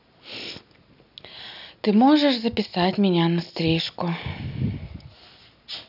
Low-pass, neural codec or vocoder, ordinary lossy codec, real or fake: 5.4 kHz; none; none; real